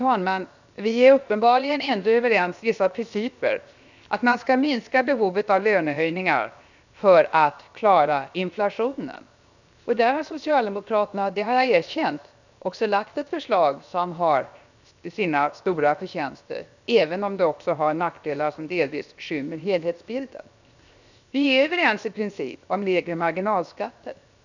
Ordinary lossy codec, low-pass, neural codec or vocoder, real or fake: none; 7.2 kHz; codec, 16 kHz, 0.7 kbps, FocalCodec; fake